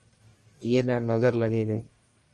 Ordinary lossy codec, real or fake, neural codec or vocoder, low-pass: Opus, 32 kbps; fake; codec, 44.1 kHz, 1.7 kbps, Pupu-Codec; 10.8 kHz